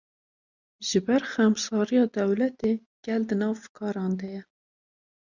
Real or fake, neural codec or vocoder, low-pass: real; none; 7.2 kHz